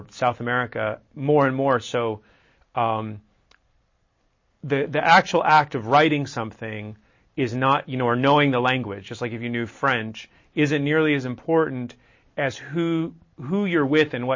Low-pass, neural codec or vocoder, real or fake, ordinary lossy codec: 7.2 kHz; none; real; MP3, 32 kbps